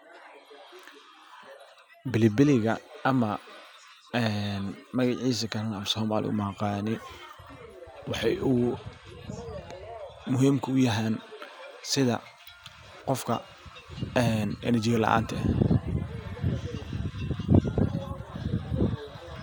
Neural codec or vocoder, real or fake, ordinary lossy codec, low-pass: none; real; none; none